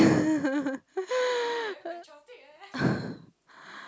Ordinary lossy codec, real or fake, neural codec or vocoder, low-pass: none; real; none; none